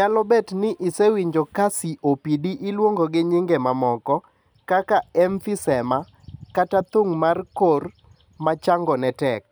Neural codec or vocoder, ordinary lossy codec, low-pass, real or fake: none; none; none; real